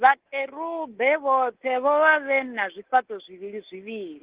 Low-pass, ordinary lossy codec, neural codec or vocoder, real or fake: 3.6 kHz; Opus, 16 kbps; codec, 24 kHz, 3.1 kbps, DualCodec; fake